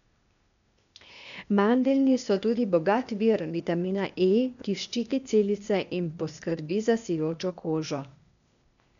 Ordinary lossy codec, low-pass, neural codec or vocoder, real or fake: none; 7.2 kHz; codec, 16 kHz, 0.8 kbps, ZipCodec; fake